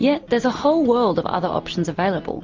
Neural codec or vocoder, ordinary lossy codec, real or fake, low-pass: none; Opus, 32 kbps; real; 7.2 kHz